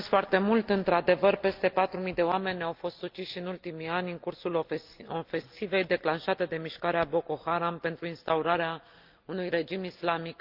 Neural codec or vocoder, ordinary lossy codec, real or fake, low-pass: none; Opus, 24 kbps; real; 5.4 kHz